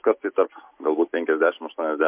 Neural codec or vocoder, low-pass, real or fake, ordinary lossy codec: none; 3.6 kHz; real; MP3, 24 kbps